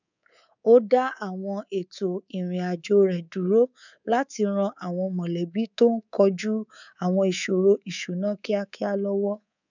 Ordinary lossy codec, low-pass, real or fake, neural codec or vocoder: none; 7.2 kHz; fake; codec, 24 kHz, 3.1 kbps, DualCodec